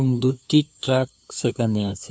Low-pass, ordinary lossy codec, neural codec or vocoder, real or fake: none; none; codec, 16 kHz, 2 kbps, FunCodec, trained on LibriTTS, 25 frames a second; fake